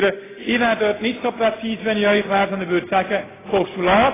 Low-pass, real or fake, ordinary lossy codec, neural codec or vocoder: 3.6 kHz; real; AAC, 16 kbps; none